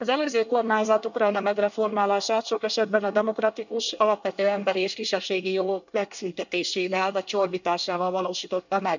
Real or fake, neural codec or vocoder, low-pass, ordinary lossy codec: fake; codec, 24 kHz, 1 kbps, SNAC; 7.2 kHz; none